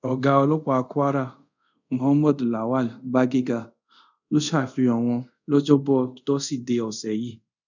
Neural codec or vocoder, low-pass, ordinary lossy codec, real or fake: codec, 24 kHz, 0.5 kbps, DualCodec; 7.2 kHz; none; fake